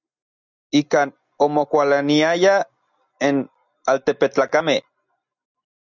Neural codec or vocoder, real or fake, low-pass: none; real; 7.2 kHz